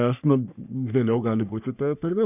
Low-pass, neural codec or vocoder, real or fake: 3.6 kHz; codec, 44.1 kHz, 3.4 kbps, Pupu-Codec; fake